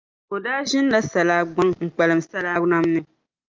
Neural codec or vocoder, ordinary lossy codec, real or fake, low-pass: none; Opus, 32 kbps; real; 7.2 kHz